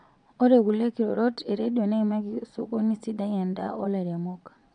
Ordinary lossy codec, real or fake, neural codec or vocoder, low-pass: none; fake; vocoder, 22.05 kHz, 80 mel bands, Vocos; 9.9 kHz